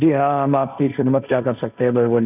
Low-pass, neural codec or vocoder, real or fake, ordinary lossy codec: 3.6 kHz; codec, 16 kHz, 1.1 kbps, Voila-Tokenizer; fake; none